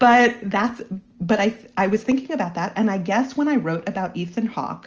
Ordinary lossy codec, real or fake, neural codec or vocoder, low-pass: Opus, 24 kbps; real; none; 7.2 kHz